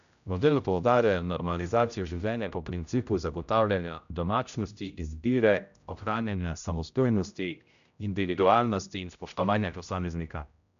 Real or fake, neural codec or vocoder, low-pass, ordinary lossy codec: fake; codec, 16 kHz, 0.5 kbps, X-Codec, HuBERT features, trained on general audio; 7.2 kHz; none